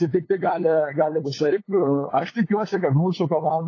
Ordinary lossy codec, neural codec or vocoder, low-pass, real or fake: AAC, 32 kbps; codec, 16 kHz, 8 kbps, FunCodec, trained on LibriTTS, 25 frames a second; 7.2 kHz; fake